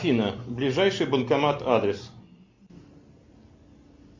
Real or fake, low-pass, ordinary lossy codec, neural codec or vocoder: real; 7.2 kHz; MP3, 48 kbps; none